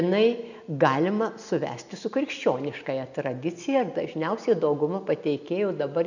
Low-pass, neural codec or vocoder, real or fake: 7.2 kHz; none; real